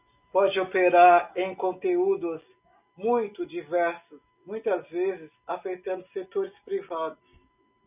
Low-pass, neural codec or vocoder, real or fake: 3.6 kHz; none; real